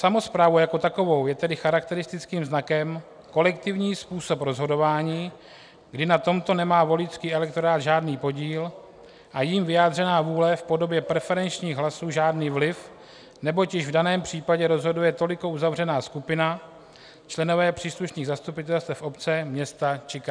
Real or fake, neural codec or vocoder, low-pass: real; none; 9.9 kHz